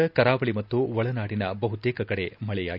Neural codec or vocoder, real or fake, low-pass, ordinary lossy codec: none; real; 5.4 kHz; none